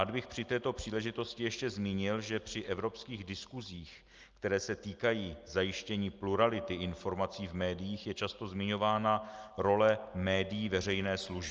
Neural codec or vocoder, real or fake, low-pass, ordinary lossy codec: none; real; 7.2 kHz; Opus, 24 kbps